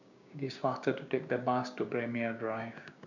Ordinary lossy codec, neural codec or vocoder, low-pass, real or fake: none; codec, 16 kHz, 6 kbps, DAC; 7.2 kHz; fake